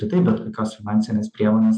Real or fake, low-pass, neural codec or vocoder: real; 9.9 kHz; none